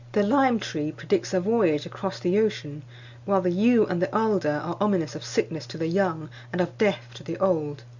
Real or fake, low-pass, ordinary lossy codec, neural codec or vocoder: real; 7.2 kHz; Opus, 64 kbps; none